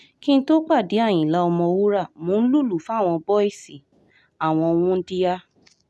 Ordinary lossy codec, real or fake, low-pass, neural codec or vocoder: none; real; none; none